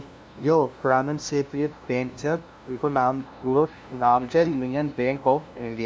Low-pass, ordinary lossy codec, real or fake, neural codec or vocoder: none; none; fake; codec, 16 kHz, 0.5 kbps, FunCodec, trained on LibriTTS, 25 frames a second